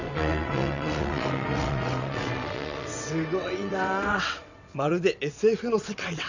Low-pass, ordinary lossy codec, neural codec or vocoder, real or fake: 7.2 kHz; none; vocoder, 22.05 kHz, 80 mel bands, WaveNeXt; fake